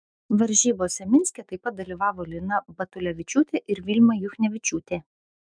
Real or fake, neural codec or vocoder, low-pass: fake; autoencoder, 48 kHz, 128 numbers a frame, DAC-VAE, trained on Japanese speech; 9.9 kHz